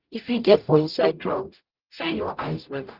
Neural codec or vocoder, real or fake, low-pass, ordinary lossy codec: codec, 44.1 kHz, 0.9 kbps, DAC; fake; 5.4 kHz; Opus, 32 kbps